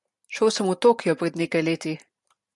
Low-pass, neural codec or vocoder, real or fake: 10.8 kHz; vocoder, 44.1 kHz, 128 mel bands every 512 samples, BigVGAN v2; fake